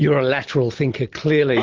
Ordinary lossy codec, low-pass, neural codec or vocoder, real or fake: Opus, 16 kbps; 7.2 kHz; none; real